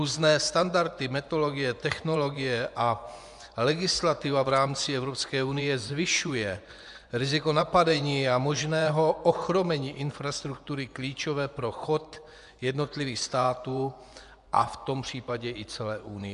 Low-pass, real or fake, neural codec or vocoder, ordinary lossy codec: 10.8 kHz; fake; vocoder, 24 kHz, 100 mel bands, Vocos; AAC, 96 kbps